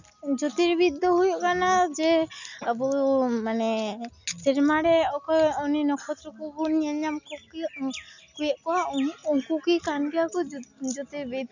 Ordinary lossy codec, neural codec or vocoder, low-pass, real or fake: none; none; 7.2 kHz; real